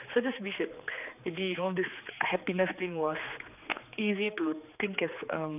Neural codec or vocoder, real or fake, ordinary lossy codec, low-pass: codec, 16 kHz, 4 kbps, X-Codec, HuBERT features, trained on general audio; fake; none; 3.6 kHz